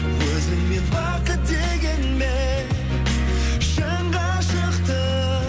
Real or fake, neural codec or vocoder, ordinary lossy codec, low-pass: real; none; none; none